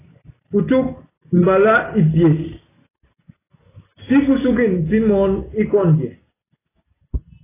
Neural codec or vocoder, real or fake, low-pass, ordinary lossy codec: none; real; 3.6 kHz; AAC, 16 kbps